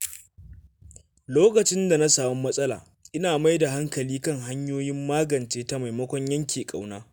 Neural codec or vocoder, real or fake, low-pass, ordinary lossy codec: none; real; none; none